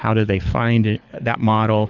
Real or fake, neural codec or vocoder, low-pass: fake; codec, 24 kHz, 6 kbps, HILCodec; 7.2 kHz